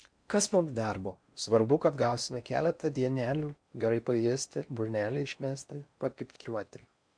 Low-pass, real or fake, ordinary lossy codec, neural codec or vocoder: 9.9 kHz; fake; MP3, 64 kbps; codec, 16 kHz in and 24 kHz out, 0.6 kbps, FocalCodec, streaming, 4096 codes